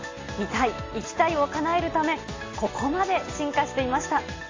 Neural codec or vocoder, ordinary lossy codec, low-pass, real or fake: none; AAC, 32 kbps; 7.2 kHz; real